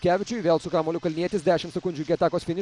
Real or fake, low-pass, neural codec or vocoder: fake; 10.8 kHz; vocoder, 44.1 kHz, 128 mel bands every 256 samples, BigVGAN v2